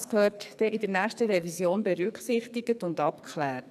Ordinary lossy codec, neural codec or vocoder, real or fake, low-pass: none; codec, 44.1 kHz, 2.6 kbps, SNAC; fake; 14.4 kHz